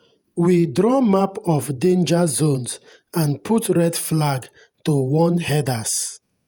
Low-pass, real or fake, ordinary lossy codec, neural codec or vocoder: none; fake; none; vocoder, 48 kHz, 128 mel bands, Vocos